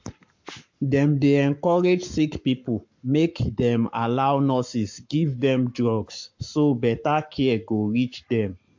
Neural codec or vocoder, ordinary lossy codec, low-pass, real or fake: codec, 44.1 kHz, 7.8 kbps, Pupu-Codec; MP3, 48 kbps; 7.2 kHz; fake